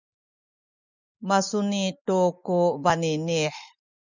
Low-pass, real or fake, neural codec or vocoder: 7.2 kHz; real; none